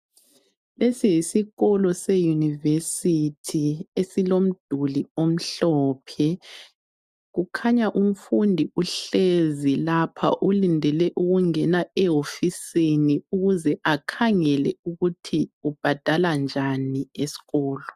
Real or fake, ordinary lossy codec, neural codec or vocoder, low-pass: real; MP3, 96 kbps; none; 14.4 kHz